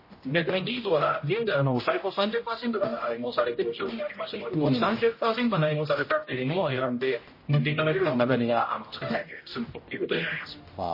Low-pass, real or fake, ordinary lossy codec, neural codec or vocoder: 5.4 kHz; fake; MP3, 24 kbps; codec, 16 kHz, 0.5 kbps, X-Codec, HuBERT features, trained on general audio